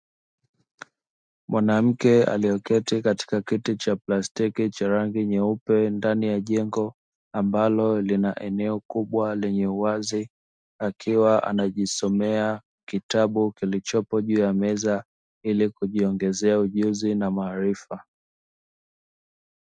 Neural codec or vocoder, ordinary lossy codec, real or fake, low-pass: none; MP3, 96 kbps; real; 9.9 kHz